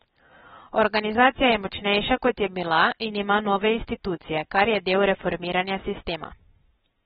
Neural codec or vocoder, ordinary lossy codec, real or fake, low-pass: none; AAC, 16 kbps; real; 19.8 kHz